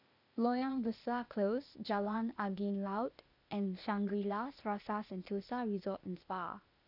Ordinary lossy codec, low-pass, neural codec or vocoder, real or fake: none; 5.4 kHz; codec, 16 kHz, 0.8 kbps, ZipCodec; fake